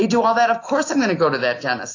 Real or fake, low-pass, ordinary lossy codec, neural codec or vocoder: real; 7.2 kHz; AAC, 32 kbps; none